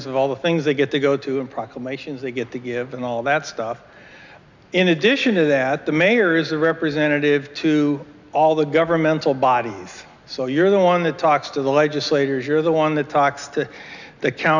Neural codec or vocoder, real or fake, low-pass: none; real; 7.2 kHz